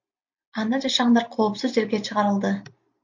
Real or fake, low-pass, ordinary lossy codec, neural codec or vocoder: real; 7.2 kHz; MP3, 48 kbps; none